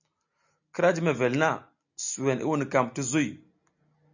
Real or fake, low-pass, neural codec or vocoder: real; 7.2 kHz; none